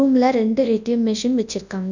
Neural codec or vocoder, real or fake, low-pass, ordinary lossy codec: codec, 24 kHz, 0.9 kbps, WavTokenizer, large speech release; fake; 7.2 kHz; none